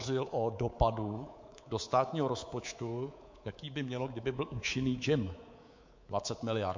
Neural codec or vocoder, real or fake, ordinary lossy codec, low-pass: codec, 24 kHz, 3.1 kbps, DualCodec; fake; MP3, 48 kbps; 7.2 kHz